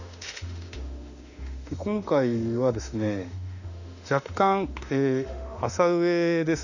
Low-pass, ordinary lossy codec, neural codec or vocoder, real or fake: 7.2 kHz; none; autoencoder, 48 kHz, 32 numbers a frame, DAC-VAE, trained on Japanese speech; fake